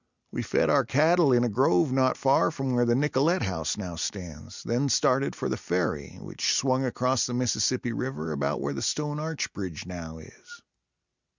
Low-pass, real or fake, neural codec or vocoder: 7.2 kHz; real; none